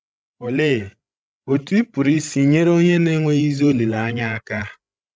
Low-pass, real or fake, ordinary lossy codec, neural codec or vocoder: none; fake; none; codec, 16 kHz, 16 kbps, FreqCodec, larger model